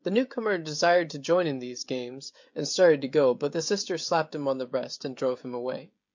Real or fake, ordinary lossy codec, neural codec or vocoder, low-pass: fake; MP3, 48 kbps; codec, 16 kHz, 16 kbps, FreqCodec, smaller model; 7.2 kHz